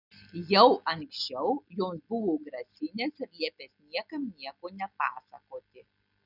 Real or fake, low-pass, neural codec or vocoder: real; 5.4 kHz; none